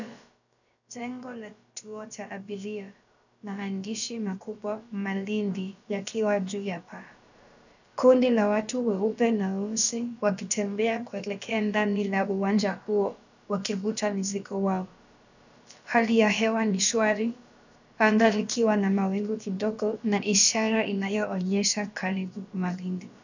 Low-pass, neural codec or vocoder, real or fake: 7.2 kHz; codec, 16 kHz, about 1 kbps, DyCAST, with the encoder's durations; fake